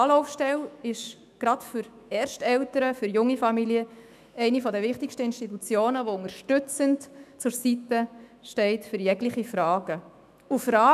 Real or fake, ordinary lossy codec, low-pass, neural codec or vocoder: fake; none; 14.4 kHz; autoencoder, 48 kHz, 128 numbers a frame, DAC-VAE, trained on Japanese speech